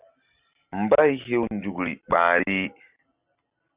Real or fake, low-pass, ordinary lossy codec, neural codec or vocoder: real; 3.6 kHz; Opus, 64 kbps; none